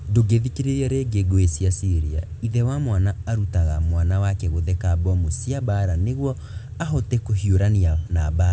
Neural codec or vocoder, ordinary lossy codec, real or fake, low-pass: none; none; real; none